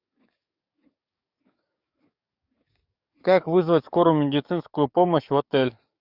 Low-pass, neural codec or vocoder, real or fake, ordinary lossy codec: 5.4 kHz; codec, 44.1 kHz, 7.8 kbps, DAC; fake; Opus, 64 kbps